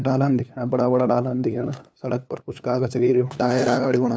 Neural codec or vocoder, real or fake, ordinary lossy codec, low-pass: codec, 16 kHz, 2 kbps, FunCodec, trained on LibriTTS, 25 frames a second; fake; none; none